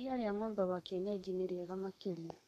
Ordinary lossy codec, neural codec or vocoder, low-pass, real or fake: MP3, 64 kbps; codec, 32 kHz, 1.9 kbps, SNAC; 14.4 kHz; fake